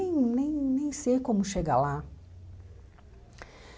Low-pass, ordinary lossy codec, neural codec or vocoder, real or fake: none; none; none; real